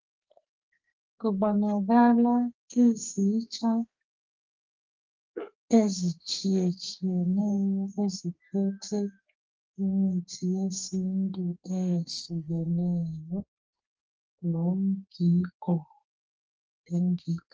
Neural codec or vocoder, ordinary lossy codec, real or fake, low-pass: codec, 32 kHz, 1.9 kbps, SNAC; Opus, 24 kbps; fake; 7.2 kHz